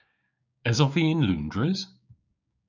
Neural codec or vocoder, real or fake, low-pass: codec, 16 kHz, 6 kbps, DAC; fake; 7.2 kHz